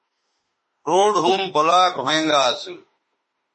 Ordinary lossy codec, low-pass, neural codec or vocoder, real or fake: MP3, 32 kbps; 10.8 kHz; autoencoder, 48 kHz, 32 numbers a frame, DAC-VAE, trained on Japanese speech; fake